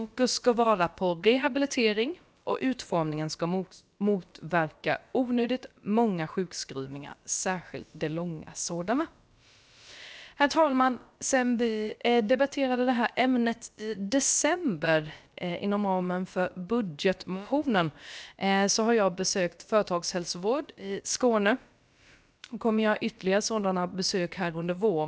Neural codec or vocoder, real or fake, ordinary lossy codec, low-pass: codec, 16 kHz, about 1 kbps, DyCAST, with the encoder's durations; fake; none; none